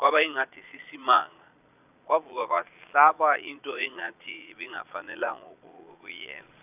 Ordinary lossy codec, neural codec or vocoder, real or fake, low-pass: none; vocoder, 22.05 kHz, 80 mel bands, Vocos; fake; 3.6 kHz